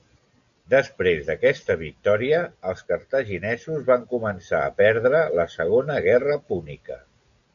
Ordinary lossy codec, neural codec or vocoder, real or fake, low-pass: AAC, 96 kbps; none; real; 7.2 kHz